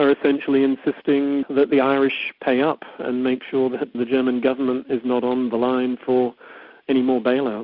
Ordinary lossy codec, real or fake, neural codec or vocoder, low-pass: Opus, 64 kbps; real; none; 5.4 kHz